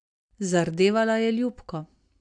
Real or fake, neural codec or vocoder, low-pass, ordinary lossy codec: real; none; 9.9 kHz; AAC, 64 kbps